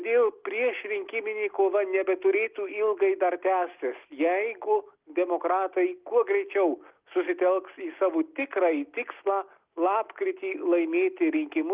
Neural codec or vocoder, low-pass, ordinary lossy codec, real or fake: none; 3.6 kHz; Opus, 32 kbps; real